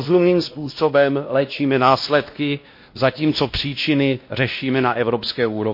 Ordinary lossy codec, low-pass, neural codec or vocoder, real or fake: MP3, 32 kbps; 5.4 kHz; codec, 16 kHz, 1 kbps, X-Codec, WavLM features, trained on Multilingual LibriSpeech; fake